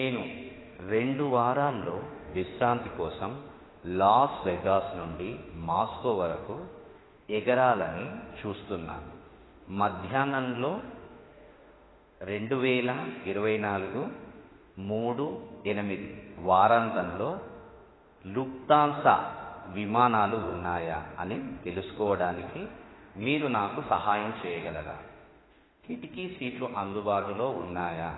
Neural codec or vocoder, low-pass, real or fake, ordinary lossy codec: autoencoder, 48 kHz, 32 numbers a frame, DAC-VAE, trained on Japanese speech; 7.2 kHz; fake; AAC, 16 kbps